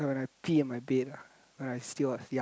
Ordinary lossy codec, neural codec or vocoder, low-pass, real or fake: none; none; none; real